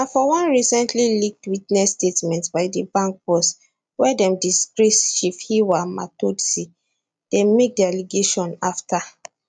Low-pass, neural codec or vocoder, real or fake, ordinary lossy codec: 9.9 kHz; none; real; MP3, 96 kbps